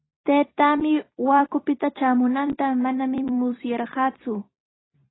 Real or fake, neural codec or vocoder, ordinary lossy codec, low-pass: fake; vocoder, 44.1 kHz, 128 mel bands every 256 samples, BigVGAN v2; AAC, 16 kbps; 7.2 kHz